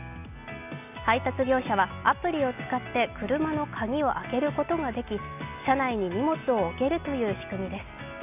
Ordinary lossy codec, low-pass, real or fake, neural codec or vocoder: none; 3.6 kHz; real; none